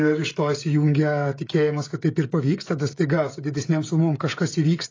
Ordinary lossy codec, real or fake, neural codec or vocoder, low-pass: AAC, 32 kbps; fake; codec, 16 kHz, 16 kbps, FreqCodec, smaller model; 7.2 kHz